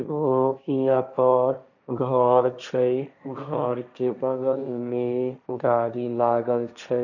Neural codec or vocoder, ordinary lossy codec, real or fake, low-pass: codec, 16 kHz, 1.1 kbps, Voila-Tokenizer; none; fake; 7.2 kHz